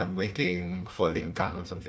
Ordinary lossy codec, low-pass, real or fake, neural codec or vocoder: none; none; fake; codec, 16 kHz, 1 kbps, FunCodec, trained on Chinese and English, 50 frames a second